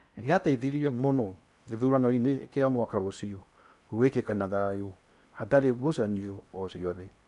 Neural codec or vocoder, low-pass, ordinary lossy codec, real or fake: codec, 16 kHz in and 24 kHz out, 0.8 kbps, FocalCodec, streaming, 65536 codes; 10.8 kHz; none; fake